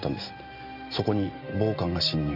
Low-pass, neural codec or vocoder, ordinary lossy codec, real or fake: 5.4 kHz; none; AAC, 48 kbps; real